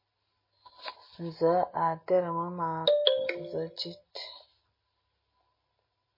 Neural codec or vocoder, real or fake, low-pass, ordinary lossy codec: none; real; 5.4 kHz; MP3, 24 kbps